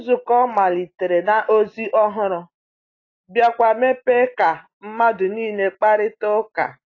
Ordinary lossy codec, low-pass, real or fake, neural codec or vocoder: AAC, 32 kbps; 7.2 kHz; real; none